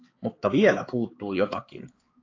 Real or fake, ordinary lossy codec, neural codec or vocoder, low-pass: fake; AAC, 32 kbps; codec, 16 kHz, 4 kbps, X-Codec, HuBERT features, trained on balanced general audio; 7.2 kHz